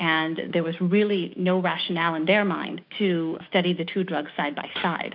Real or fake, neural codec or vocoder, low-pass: fake; codec, 16 kHz, 6 kbps, DAC; 5.4 kHz